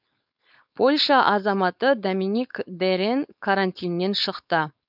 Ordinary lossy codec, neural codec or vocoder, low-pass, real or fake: none; codec, 16 kHz, 4.8 kbps, FACodec; 5.4 kHz; fake